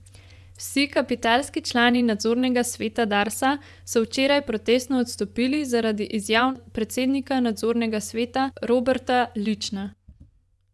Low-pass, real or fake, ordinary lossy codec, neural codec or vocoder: none; fake; none; vocoder, 24 kHz, 100 mel bands, Vocos